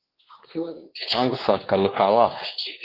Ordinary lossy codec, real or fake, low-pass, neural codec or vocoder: Opus, 16 kbps; fake; 5.4 kHz; codec, 16 kHz, 2 kbps, X-Codec, WavLM features, trained on Multilingual LibriSpeech